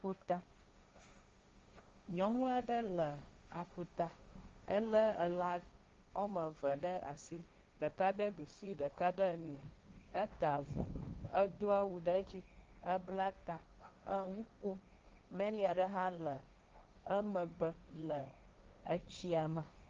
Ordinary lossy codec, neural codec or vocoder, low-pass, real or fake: Opus, 32 kbps; codec, 16 kHz, 1.1 kbps, Voila-Tokenizer; 7.2 kHz; fake